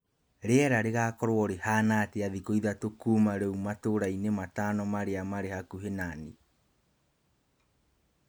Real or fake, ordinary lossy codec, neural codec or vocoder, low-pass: real; none; none; none